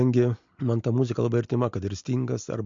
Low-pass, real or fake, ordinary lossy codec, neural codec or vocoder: 7.2 kHz; real; MP3, 48 kbps; none